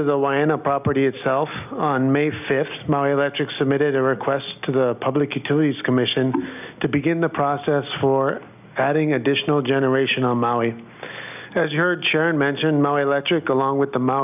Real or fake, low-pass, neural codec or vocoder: real; 3.6 kHz; none